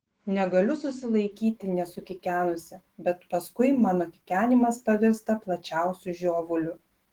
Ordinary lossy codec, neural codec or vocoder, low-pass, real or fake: Opus, 16 kbps; autoencoder, 48 kHz, 128 numbers a frame, DAC-VAE, trained on Japanese speech; 19.8 kHz; fake